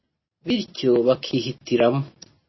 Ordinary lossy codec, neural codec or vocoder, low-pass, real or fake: MP3, 24 kbps; none; 7.2 kHz; real